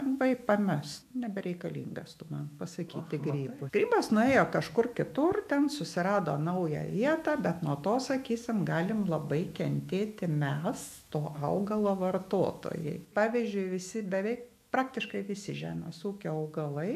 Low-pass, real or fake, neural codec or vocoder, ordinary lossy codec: 14.4 kHz; fake; autoencoder, 48 kHz, 128 numbers a frame, DAC-VAE, trained on Japanese speech; MP3, 96 kbps